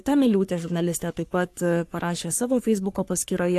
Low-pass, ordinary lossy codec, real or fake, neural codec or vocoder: 14.4 kHz; AAC, 64 kbps; fake; codec, 44.1 kHz, 3.4 kbps, Pupu-Codec